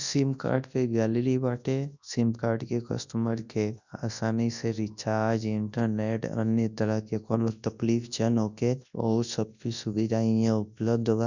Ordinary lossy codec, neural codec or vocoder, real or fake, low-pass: none; codec, 24 kHz, 0.9 kbps, WavTokenizer, large speech release; fake; 7.2 kHz